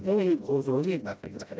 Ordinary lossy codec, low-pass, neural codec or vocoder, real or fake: none; none; codec, 16 kHz, 0.5 kbps, FreqCodec, smaller model; fake